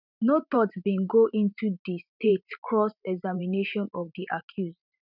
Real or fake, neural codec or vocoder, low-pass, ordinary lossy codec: fake; vocoder, 44.1 kHz, 128 mel bands every 512 samples, BigVGAN v2; 5.4 kHz; none